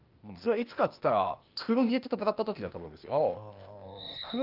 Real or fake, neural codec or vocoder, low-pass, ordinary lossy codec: fake; codec, 16 kHz, 0.8 kbps, ZipCodec; 5.4 kHz; Opus, 24 kbps